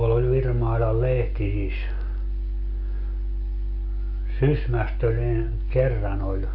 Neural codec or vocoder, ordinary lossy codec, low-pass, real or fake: none; Opus, 64 kbps; 5.4 kHz; real